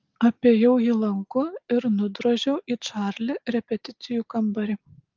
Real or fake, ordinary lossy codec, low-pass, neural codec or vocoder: real; Opus, 24 kbps; 7.2 kHz; none